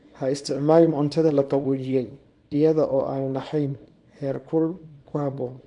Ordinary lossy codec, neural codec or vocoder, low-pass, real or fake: MP3, 64 kbps; codec, 24 kHz, 0.9 kbps, WavTokenizer, small release; 10.8 kHz; fake